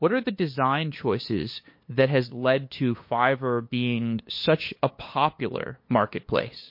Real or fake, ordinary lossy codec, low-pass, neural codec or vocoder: fake; MP3, 32 kbps; 5.4 kHz; codec, 16 kHz, 2 kbps, FunCodec, trained on LibriTTS, 25 frames a second